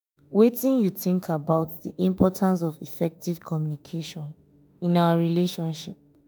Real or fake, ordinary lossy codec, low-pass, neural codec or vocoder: fake; none; none; autoencoder, 48 kHz, 32 numbers a frame, DAC-VAE, trained on Japanese speech